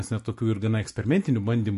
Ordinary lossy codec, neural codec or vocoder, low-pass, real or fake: MP3, 48 kbps; none; 14.4 kHz; real